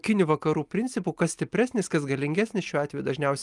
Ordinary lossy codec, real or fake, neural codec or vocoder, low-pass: Opus, 32 kbps; real; none; 10.8 kHz